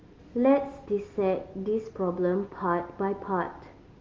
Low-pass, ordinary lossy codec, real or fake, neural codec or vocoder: 7.2 kHz; Opus, 32 kbps; real; none